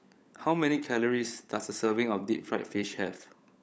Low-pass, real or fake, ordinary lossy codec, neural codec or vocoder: none; fake; none; codec, 16 kHz, 16 kbps, FunCodec, trained on LibriTTS, 50 frames a second